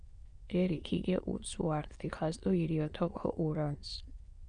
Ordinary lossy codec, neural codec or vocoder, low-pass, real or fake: Opus, 64 kbps; autoencoder, 22.05 kHz, a latent of 192 numbers a frame, VITS, trained on many speakers; 9.9 kHz; fake